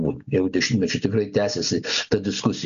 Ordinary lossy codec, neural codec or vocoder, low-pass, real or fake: MP3, 96 kbps; none; 7.2 kHz; real